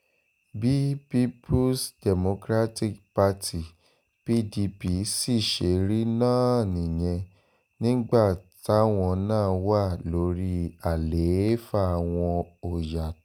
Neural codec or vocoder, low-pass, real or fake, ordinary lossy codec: none; none; real; none